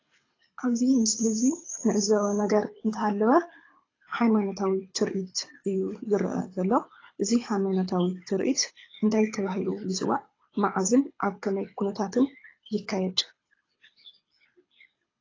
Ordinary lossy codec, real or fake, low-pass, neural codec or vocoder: AAC, 32 kbps; fake; 7.2 kHz; codec, 24 kHz, 6 kbps, HILCodec